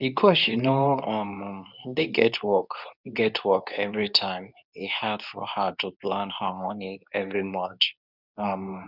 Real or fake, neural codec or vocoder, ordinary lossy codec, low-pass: fake; codec, 24 kHz, 0.9 kbps, WavTokenizer, medium speech release version 2; none; 5.4 kHz